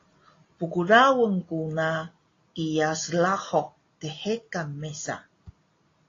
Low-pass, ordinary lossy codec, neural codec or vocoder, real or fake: 7.2 kHz; AAC, 32 kbps; none; real